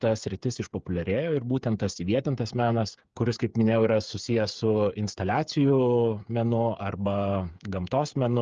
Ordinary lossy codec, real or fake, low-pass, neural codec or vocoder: Opus, 24 kbps; fake; 7.2 kHz; codec, 16 kHz, 8 kbps, FreqCodec, smaller model